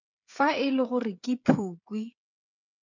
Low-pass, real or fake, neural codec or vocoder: 7.2 kHz; fake; codec, 16 kHz, 8 kbps, FreqCodec, smaller model